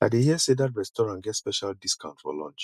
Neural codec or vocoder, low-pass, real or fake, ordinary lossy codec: none; 14.4 kHz; real; none